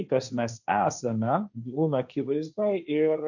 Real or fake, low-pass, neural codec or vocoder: fake; 7.2 kHz; codec, 16 kHz, 1.1 kbps, Voila-Tokenizer